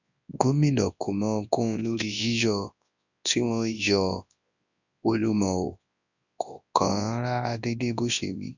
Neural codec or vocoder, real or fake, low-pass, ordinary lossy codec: codec, 24 kHz, 0.9 kbps, WavTokenizer, large speech release; fake; 7.2 kHz; none